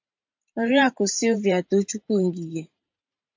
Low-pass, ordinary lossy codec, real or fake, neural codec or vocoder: 7.2 kHz; MP3, 64 kbps; fake; vocoder, 44.1 kHz, 128 mel bands every 512 samples, BigVGAN v2